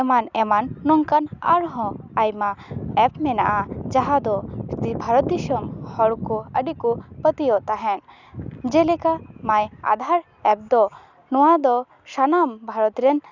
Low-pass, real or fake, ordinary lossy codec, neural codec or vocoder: 7.2 kHz; real; none; none